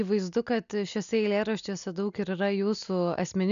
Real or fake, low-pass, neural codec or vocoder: real; 7.2 kHz; none